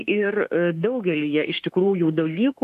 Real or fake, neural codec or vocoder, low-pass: fake; autoencoder, 48 kHz, 32 numbers a frame, DAC-VAE, trained on Japanese speech; 14.4 kHz